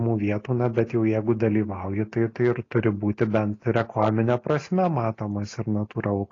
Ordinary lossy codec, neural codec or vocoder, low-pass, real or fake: AAC, 32 kbps; none; 7.2 kHz; real